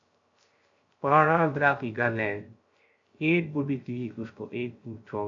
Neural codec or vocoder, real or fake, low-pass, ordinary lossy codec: codec, 16 kHz, 0.3 kbps, FocalCodec; fake; 7.2 kHz; AAC, 48 kbps